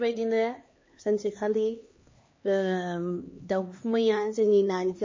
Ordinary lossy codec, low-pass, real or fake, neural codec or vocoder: MP3, 32 kbps; 7.2 kHz; fake; codec, 16 kHz, 2 kbps, X-Codec, HuBERT features, trained on LibriSpeech